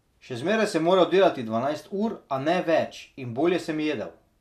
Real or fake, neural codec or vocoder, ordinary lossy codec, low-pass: real; none; none; 14.4 kHz